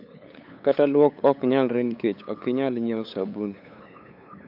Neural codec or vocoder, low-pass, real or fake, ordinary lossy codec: codec, 16 kHz, 8 kbps, FunCodec, trained on LibriTTS, 25 frames a second; 5.4 kHz; fake; none